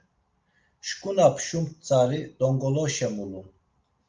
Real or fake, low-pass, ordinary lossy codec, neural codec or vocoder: real; 7.2 kHz; Opus, 24 kbps; none